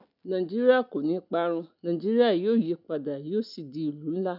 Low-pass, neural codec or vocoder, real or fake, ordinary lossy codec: 5.4 kHz; none; real; none